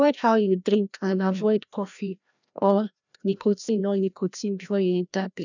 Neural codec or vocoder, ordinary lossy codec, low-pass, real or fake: codec, 16 kHz, 1 kbps, FreqCodec, larger model; none; 7.2 kHz; fake